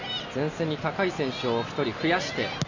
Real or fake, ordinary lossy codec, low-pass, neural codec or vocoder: real; none; 7.2 kHz; none